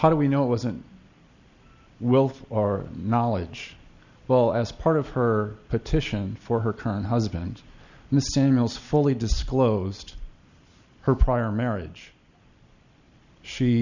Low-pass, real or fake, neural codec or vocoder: 7.2 kHz; real; none